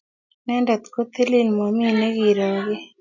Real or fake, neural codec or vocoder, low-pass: real; none; 7.2 kHz